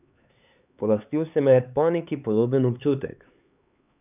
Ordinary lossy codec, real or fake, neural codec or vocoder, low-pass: none; fake; codec, 16 kHz, 4 kbps, X-Codec, HuBERT features, trained on LibriSpeech; 3.6 kHz